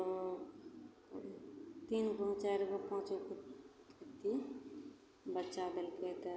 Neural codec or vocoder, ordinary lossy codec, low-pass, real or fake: none; none; none; real